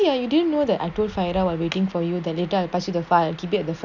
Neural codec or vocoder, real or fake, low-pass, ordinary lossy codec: none; real; 7.2 kHz; none